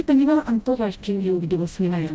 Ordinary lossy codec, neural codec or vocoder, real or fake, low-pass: none; codec, 16 kHz, 0.5 kbps, FreqCodec, smaller model; fake; none